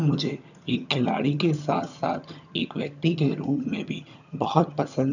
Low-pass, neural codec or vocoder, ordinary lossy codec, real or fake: 7.2 kHz; vocoder, 22.05 kHz, 80 mel bands, HiFi-GAN; none; fake